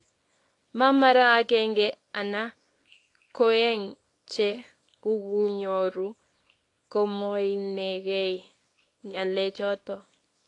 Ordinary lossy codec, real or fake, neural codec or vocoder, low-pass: AAC, 48 kbps; fake; codec, 24 kHz, 0.9 kbps, WavTokenizer, small release; 10.8 kHz